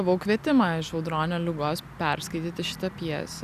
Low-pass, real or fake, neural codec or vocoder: 14.4 kHz; real; none